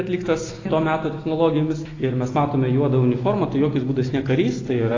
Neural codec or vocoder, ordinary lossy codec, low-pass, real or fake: none; AAC, 32 kbps; 7.2 kHz; real